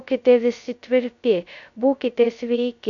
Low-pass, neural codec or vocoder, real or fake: 7.2 kHz; codec, 16 kHz, 0.2 kbps, FocalCodec; fake